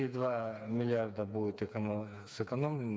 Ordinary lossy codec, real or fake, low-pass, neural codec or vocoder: none; fake; none; codec, 16 kHz, 4 kbps, FreqCodec, smaller model